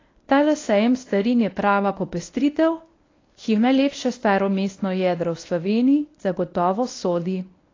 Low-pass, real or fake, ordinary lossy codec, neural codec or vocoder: 7.2 kHz; fake; AAC, 32 kbps; codec, 24 kHz, 0.9 kbps, WavTokenizer, medium speech release version 1